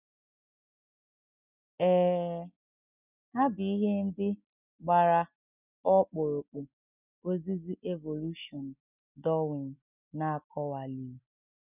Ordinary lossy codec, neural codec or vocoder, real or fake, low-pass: none; none; real; 3.6 kHz